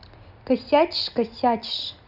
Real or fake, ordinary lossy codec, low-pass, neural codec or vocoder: real; none; 5.4 kHz; none